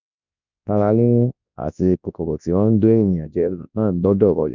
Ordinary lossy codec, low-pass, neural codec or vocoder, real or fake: none; 7.2 kHz; codec, 24 kHz, 0.9 kbps, WavTokenizer, large speech release; fake